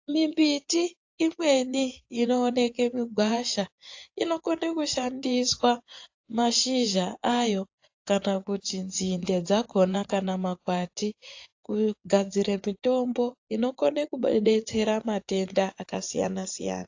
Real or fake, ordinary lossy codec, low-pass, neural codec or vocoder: fake; AAC, 48 kbps; 7.2 kHz; vocoder, 24 kHz, 100 mel bands, Vocos